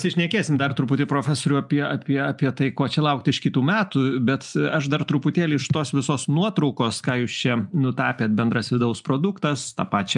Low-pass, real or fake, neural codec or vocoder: 10.8 kHz; real; none